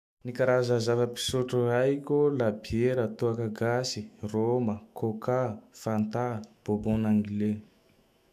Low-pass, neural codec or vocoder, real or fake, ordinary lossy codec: 14.4 kHz; autoencoder, 48 kHz, 128 numbers a frame, DAC-VAE, trained on Japanese speech; fake; none